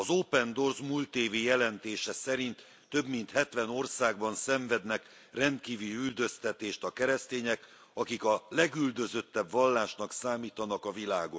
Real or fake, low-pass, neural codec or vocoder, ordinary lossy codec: real; none; none; none